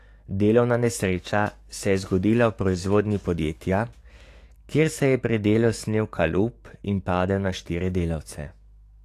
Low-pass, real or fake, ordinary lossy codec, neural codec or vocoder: 14.4 kHz; fake; AAC, 64 kbps; codec, 44.1 kHz, 7.8 kbps, DAC